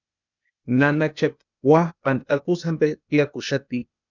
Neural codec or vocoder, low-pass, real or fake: codec, 16 kHz, 0.8 kbps, ZipCodec; 7.2 kHz; fake